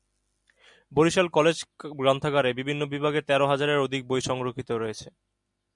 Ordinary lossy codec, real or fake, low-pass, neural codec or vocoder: MP3, 96 kbps; real; 10.8 kHz; none